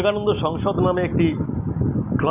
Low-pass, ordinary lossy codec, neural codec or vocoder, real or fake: 3.6 kHz; none; none; real